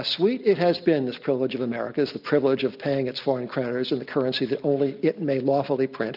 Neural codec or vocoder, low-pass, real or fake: none; 5.4 kHz; real